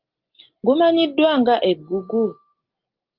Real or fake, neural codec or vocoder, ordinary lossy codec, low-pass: real; none; Opus, 32 kbps; 5.4 kHz